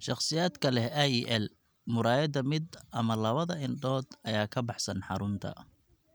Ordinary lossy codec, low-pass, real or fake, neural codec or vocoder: none; none; real; none